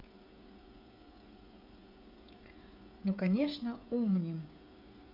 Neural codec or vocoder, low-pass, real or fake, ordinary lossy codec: codec, 16 kHz, 16 kbps, FreqCodec, smaller model; 5.4 kHz; fake; none